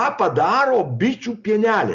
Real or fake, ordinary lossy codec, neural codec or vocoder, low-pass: real; Opus, 64 kbps; none; 7.2 kHz